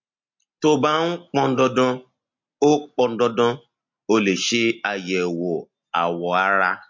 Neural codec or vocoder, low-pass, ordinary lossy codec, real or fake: none; 7.2 kHz; MP3, 48 kbps; real